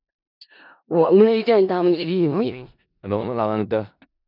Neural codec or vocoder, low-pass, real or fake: codec, 16 kHz in and 24 kHz out, 0.4 kbps, LongCat-Audio-Codec, four codebook decoder; 5.4 kHz; fake